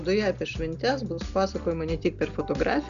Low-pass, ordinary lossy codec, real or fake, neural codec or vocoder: 7.2 kHz; MP3, 96 kbps; real; none